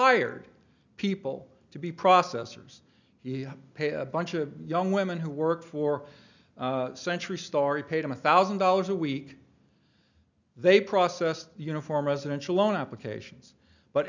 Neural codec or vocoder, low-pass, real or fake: none; 7.2 kHz; real